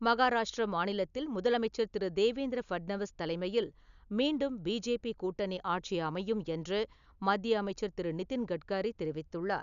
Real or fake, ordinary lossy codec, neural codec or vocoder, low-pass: real; none; none; 7.2 kHz